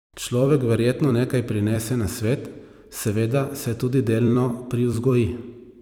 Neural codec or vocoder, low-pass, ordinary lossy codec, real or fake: vocoder, 44.1 kHz, 128 mel bands every 256 samples, BigVGAN v2; 19.8 kHz; none; fake